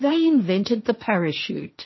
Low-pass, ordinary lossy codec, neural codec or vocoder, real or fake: 7.2 kHz; MP3, 24 kbps; codec, 16 kHz, 1.1 kbps, Voila-Tokenizer; fake